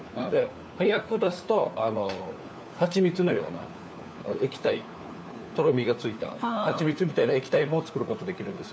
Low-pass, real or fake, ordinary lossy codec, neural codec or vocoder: none; fake; none; codec, 16 kHz, 4 kbps, FunCodec, trained on LibriTTS, 50 frames a second